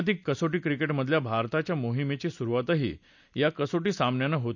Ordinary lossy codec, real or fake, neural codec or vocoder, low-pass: none; real; none; 7.2 kHz